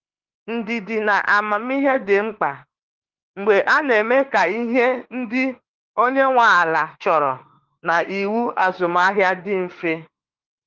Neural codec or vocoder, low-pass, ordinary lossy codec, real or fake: autoencoder, 48 kHz, 32 numbers a frame, DAC-VAE, trained on Japanese speech; 7.2 kHz; Opus, 16 kbps; fake